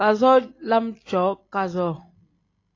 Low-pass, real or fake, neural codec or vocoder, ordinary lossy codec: 7.2 kHz; real; none; AAC, 32 kbps